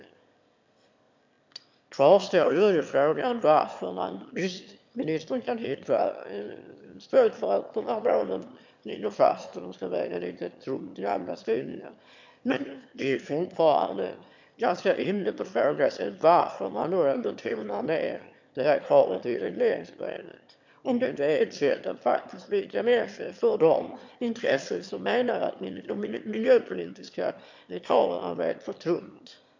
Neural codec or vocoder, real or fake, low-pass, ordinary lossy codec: autoencoder, 22.05 kHz, a latent of 192 numbers a frame, VITS, trained on one speaker; fake; 7.2 kHz; MP3, 64 kbps